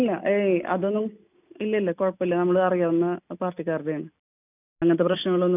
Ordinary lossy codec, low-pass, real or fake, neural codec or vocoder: none; 3.6 kHz; real; none